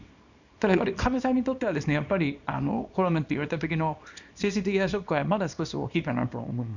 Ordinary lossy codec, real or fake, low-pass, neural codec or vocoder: none; fake; 7.2 kHz; codec, 24 kHz, 0.9 kbps, WavTokenizer, small release